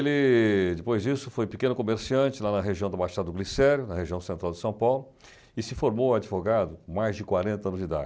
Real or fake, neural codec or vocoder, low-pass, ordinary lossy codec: real; none; none; none